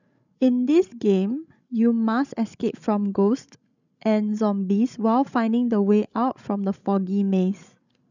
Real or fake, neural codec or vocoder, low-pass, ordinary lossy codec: fake; codec, 16 kHz, 16 kbps, FreqCodec, larger model; 7.2 kHz; none